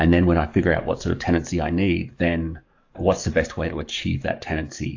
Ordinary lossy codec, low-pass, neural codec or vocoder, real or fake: AAC, 48 kbps; 7.2 kHz; codec, 44.1 kHz, 7.8 kbps, DAC; fake